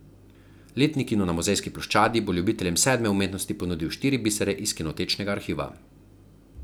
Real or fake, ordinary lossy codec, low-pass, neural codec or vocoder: real; none; none; none